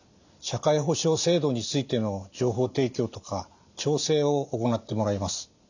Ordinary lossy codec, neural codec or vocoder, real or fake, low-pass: none; none; real; 7.2 kHz